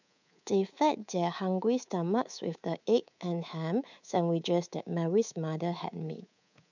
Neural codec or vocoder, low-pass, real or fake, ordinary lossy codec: codec, 24 kHz, 3.1 kbps, DualCodec; 7.2 kHz; fake; none